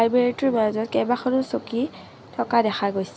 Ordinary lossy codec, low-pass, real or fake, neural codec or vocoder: none; none; real; none